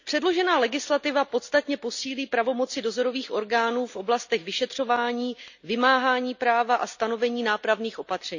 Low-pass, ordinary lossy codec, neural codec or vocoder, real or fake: 7.2 kHz; none; none; real